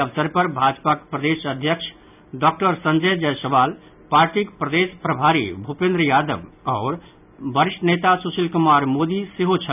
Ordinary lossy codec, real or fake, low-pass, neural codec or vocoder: none; real; 3.6 kHz; none